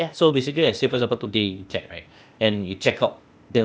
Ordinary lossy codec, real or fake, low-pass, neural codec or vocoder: none; fake; none; codec, 16 kHz, 0.8 kbps, ZipCodec